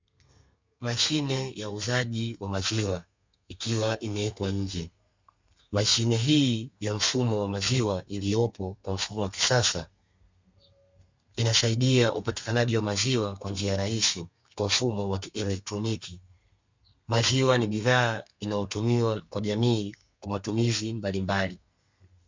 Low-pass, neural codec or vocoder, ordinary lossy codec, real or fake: 7.2 kHz; codec, 32 kHz, 1.9 kbps, SNAC; MP3, 64 kbps; fake